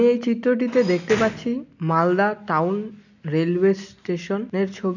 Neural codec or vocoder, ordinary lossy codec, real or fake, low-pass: none; none; real; 7.2 kHz